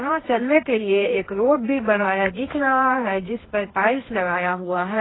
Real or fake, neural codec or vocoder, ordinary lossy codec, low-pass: fake; codec, 24 kHz, 0.9 kbps, WavTokenizer, medium music audio release; AAC, 16 kbps; 7.2 kHz